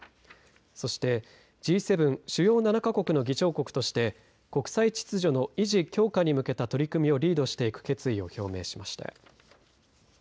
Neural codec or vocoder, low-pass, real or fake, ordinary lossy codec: none; none; real; none